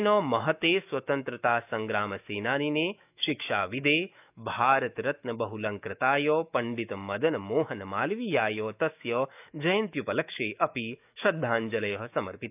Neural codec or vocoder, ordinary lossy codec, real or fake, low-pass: autoencoder, 48 kHz, 128 numbers a frame, DAC-VAE, trained on Japanese speech; none; fake; 3.6 kHz